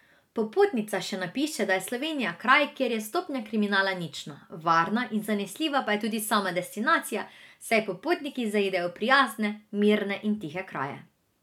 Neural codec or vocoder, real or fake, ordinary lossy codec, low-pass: none; real; none; 19.8 kHz